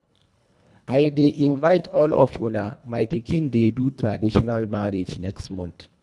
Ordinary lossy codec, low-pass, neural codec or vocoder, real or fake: none; none; codec, 24 kHz, 1.5 kbps, HILCodec; fake